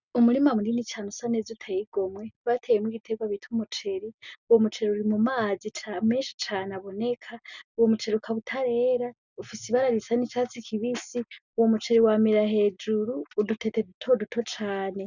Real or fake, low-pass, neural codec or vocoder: real; 7.2 kHz; none